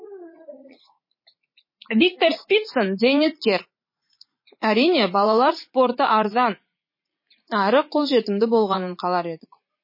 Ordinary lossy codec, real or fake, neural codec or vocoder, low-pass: MP3, 24 kbps; fake; vocoder, 44.1 kHz, 80 mel bands, Vocos; 5.4 kHz